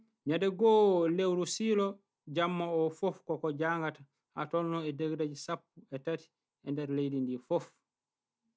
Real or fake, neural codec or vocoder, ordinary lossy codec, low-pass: real; none; none; none